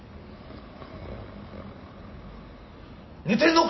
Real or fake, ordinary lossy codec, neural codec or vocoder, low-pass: real; MP3, 24 kbps; none; 7.2 kHz